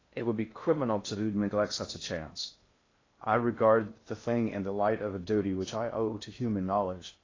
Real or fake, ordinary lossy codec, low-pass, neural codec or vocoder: fake; AAC, 32 kbps; 7.2 kHz; codec, 16 kHz in and 24 kHz out, 0.6 kbps, FocalCodec, streaming, 2048 codes